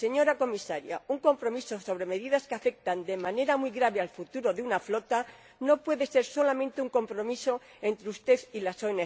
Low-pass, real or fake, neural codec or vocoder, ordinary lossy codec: none; real; none; none